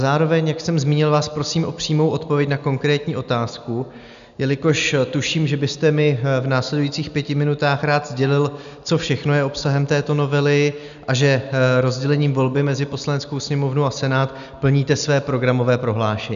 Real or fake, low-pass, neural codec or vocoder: real; 7.2 kHz; none